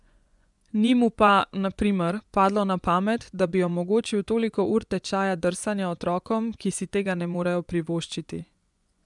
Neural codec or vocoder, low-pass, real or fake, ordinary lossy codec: vocoder, 24 kHz, 100 mel bands, Vocos; 10.8 kHz; fake; none